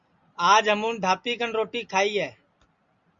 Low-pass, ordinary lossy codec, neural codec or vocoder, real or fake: 7.2 kHz; Opus, 64 kbps; none; real